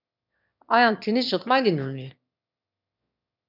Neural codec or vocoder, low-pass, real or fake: autoencoder, 22.05 kHz, a latent of 192 numbers a frame, VITS, trained on one speaker; 5.4 kHz; fake